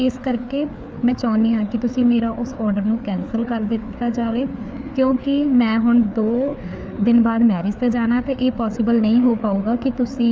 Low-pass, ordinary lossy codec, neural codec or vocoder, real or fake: none; none; codec, 16 kHz, 4 kbps, FreqCodec, larger model; fake